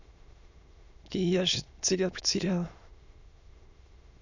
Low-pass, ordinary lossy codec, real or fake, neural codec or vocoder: 7.2 kHz; none; fake; autoencoder, 22.05 kHz, a latent of 192 numbers a frame, VITS, trained on many speakers